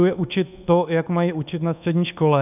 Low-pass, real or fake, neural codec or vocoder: 3.6 kHz; fake; codec, 24 kHz, 1.2 kbps, DualCodec